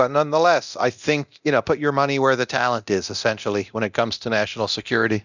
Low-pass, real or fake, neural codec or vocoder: 7.2 kHz; fake; codec, 24 kHz, 0.9 kbps, DualCodec